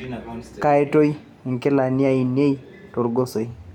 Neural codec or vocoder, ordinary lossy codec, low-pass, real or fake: none; none; 19.8 kHz; real